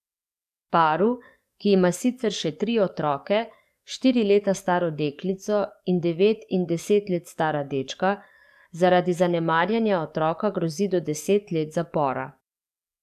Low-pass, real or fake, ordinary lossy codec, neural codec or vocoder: 14.4 kHz; fake; none; codec, 44.1 kHz, 7.8 kbps, DAC